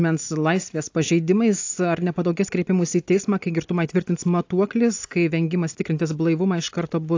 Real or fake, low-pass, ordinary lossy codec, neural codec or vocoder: real; 7.2 kHz; AAC, 48 kbps; none